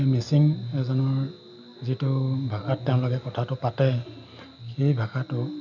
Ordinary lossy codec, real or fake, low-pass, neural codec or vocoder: none; real; 7.2 kHz; none